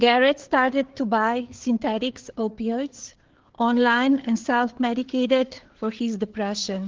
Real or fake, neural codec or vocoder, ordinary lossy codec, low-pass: fake; codec, 16 kHz, 4 kbps, FreqCodec, larger model; Opus, 16 kbps; 7.2 kHz